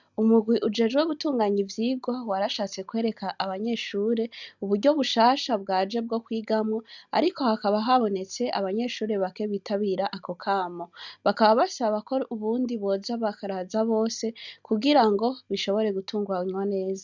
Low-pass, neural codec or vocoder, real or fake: 7.2 kHz; none; real